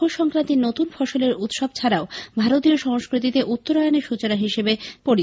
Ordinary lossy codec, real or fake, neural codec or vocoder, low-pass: none; real; none; none